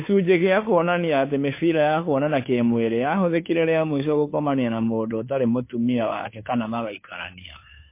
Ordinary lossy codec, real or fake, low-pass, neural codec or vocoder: MP3, 24 kbps; fake; 3.6 kHz; codec, 16 kHz, 2 kbps, FunCodec, trained on Chinese and English, 25 frames a second